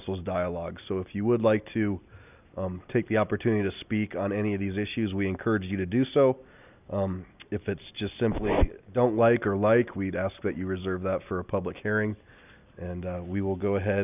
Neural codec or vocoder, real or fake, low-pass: none; real; 3.6 kHz